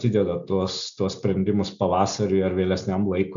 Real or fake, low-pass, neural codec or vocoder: real; 7.2 kHz; none